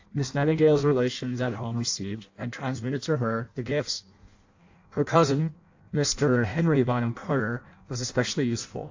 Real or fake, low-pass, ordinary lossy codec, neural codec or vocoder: fake; 7.2 kHz; AAC, 48 kbps; codec, 16 kHz in and 24 kHz out, 0.6 kbps, FireRedTTS-2 codec